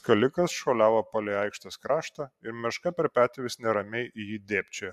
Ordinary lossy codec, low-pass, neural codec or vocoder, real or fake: AAC, 96 kbps; 14.4 kHz; none; real